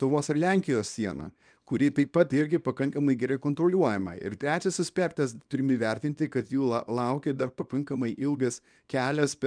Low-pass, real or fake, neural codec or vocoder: 9.9 kHz; fake; codec, 24 kHz, 0.9 kbps, WavTokenizer, small release